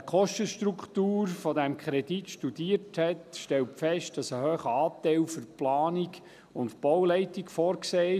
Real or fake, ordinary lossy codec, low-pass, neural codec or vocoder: real; none; 14.4 kHz; none